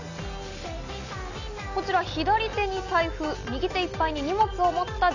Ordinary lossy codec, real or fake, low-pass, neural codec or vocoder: none; real; 7.2 kHz; none